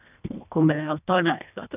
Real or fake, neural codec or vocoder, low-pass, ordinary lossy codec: fake; codec, 24 kHz, 1.5 kbps, HILCodec; 3.6 kHz; none